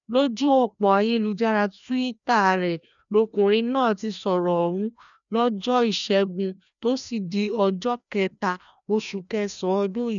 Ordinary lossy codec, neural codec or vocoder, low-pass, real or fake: none; codec, 16 kHz, 1 kbps, FreqCodec, larger model; 7.2 kHz; fake